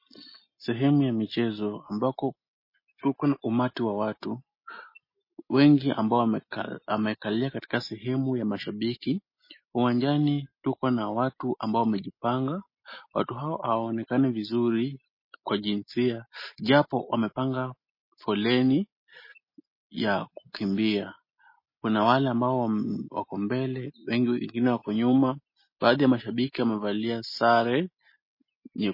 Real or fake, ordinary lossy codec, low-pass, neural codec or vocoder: real; MP3, 24 kbps; 5.4 kHz; none